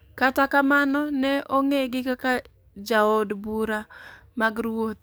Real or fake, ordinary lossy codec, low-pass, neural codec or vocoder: fake; none; none; codec, 44.1 kHz, 7.8 kbps, DAC